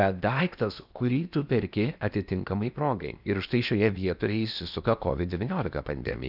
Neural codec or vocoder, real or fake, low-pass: codec, 16 kHz in and 24 kHz out, 0.8 kbps, FocalCodec, streaming, 65536 codes; fake; 5.4 kHz